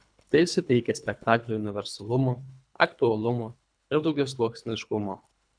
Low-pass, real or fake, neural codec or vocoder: 9.9 kHz; fake; codec, 24 kHz, 3 kbps, HILCodec